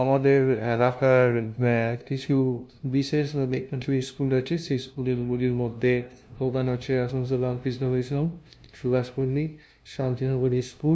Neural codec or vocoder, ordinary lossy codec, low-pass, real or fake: codec, 16 kHz, 0.5 kbps, FunCodec, trained on LibriTTS, 25 frames a second; none; none; fake